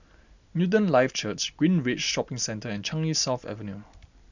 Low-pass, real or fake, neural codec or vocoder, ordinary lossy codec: 7.2 kHz; real; none; none